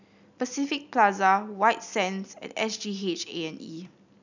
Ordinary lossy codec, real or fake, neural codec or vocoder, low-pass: none; real; none; 7.2 kHz